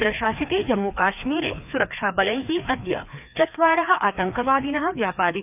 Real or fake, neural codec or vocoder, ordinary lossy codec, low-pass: fake; codec, 16 kHz, 2 kbps, FreqCodec, larger model; none; 3.6 kHz